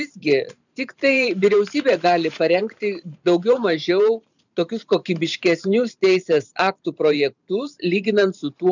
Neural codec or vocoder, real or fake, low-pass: vocoder, 44.1 kHz, 128 mel bands every 512 samples, BigVGAN v2; fake; 7.2 kHz